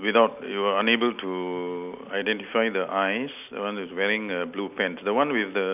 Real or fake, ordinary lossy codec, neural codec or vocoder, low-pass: real; none; none; 3.6 kHz